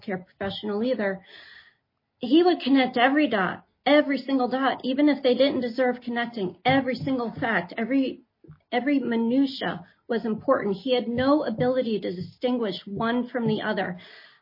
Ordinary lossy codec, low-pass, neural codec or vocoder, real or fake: MP3, 24 kbps; 5.4 kHz; none; real